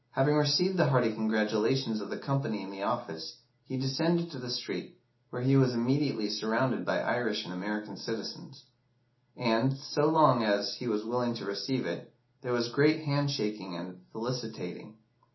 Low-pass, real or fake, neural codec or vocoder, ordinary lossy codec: 7.2 kHz; real; none; MP3, 24 kbps